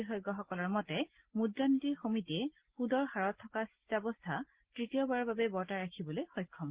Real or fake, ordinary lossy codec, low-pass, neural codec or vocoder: real; Opus, 16 kbps; 3.6 kHz; none